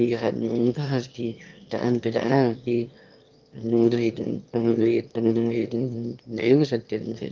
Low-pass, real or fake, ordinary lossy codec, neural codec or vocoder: 7.2 kHz; fake; Opus, 24 kbps; autoencoder, 22.05 kHz, a latent of 192 numbers a frame, VITS, trained on one speaker